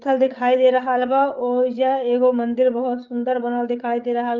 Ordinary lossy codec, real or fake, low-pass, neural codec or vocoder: Opus, 32 kbps; fake; 7.2 kHz; codec, 16 kHz, 8 kbps, FreqCodec, larger model